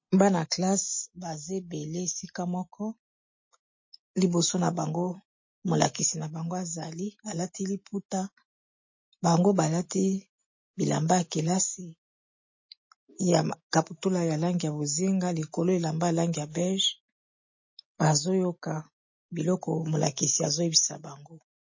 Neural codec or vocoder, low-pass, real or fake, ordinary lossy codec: none; 7.2 kHz; real; MP3, 32 kbps